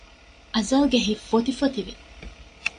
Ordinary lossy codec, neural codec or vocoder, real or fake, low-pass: AAC, 96 kbps; none; real; 9.9 kHz